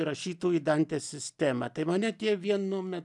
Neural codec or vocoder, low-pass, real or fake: none; 10.8 kHz; real